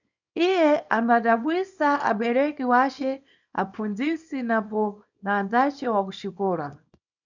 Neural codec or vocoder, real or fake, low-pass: codec, 24 kHz, 0.9 kbps, WavTokenizer, small release; fake; 7.2 kHz